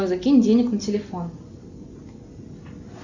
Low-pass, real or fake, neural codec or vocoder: 7.2 kHz; real; none